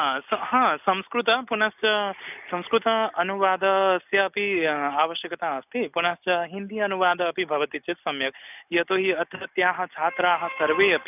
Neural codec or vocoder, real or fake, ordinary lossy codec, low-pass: none; real; none; 3.6 kHz